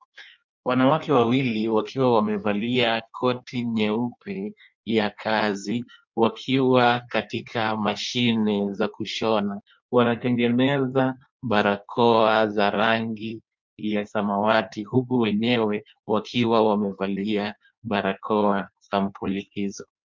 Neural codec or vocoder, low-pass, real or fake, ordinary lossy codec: codec, 16 kHz in and 24 kHz out, 1.1 kbps, FireRedTTS-2 codec; 7.2 kHz; fake; MP3, 64 kbps